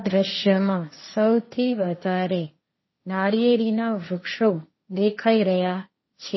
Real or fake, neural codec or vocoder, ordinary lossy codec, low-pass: fake; codec, 16 kHz, 1.1 kbps, Voila-Tokenizer; MP3, 24 kbps; 7.2 kHz